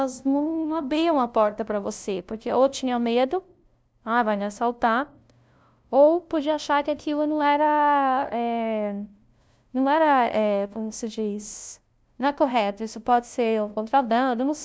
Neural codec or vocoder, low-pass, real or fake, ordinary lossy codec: codec, 16 kHz, 0.5 kbps, FunCodec, trained on LibriTTS, 25 frames a second; none; fake; none